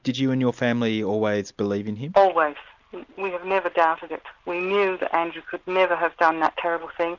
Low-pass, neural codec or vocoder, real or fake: 7.2 kHz; none; real